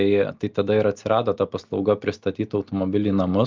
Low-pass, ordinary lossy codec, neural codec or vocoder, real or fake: 7.2 kHz; Opus, 24 kbps; none; real